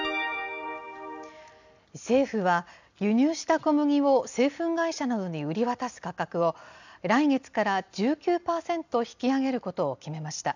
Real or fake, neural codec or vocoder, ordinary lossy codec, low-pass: real; none; none; 7.2 kHz